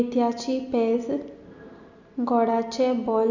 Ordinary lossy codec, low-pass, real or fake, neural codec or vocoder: none; 7.2 kHz; real; none